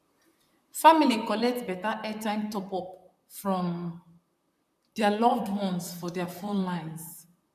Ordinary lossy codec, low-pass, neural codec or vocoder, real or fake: none; 14.4 kHz; vocoder, 44.1 kHz, 128 mel bands, Pupu-Vocoder; fake